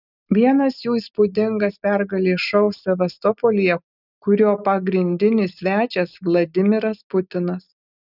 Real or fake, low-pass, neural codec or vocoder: real; 5.4 kHz; none